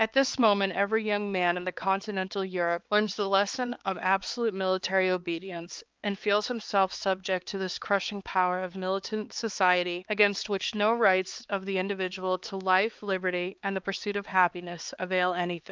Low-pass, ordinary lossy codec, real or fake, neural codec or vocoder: 7.2 kHz; Opus, 24 kbps; fake; codec, 16 kHz, 4 kbps, X-Codec, HuBERT features, trained on balanced general audio